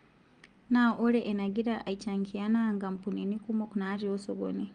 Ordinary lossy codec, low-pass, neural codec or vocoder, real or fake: Opus, 32 kbps; 9.9 kHz; none; real